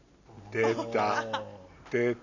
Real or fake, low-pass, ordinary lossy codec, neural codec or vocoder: real; 7.2 kHz; none; none